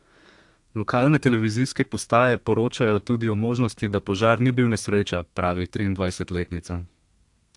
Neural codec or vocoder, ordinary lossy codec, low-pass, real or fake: codec, 32 kHz, 1.9 kbps, SNAC; AAC, 64 kbps; 10.8 kHz; fake